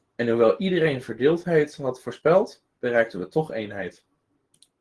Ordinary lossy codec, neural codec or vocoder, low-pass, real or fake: Opus, 16 kbps; none; 10.8 kHz; real